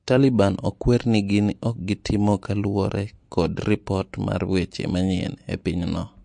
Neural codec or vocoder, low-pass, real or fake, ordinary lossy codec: none; 9.9 kHz; real; MP3, 48 kbps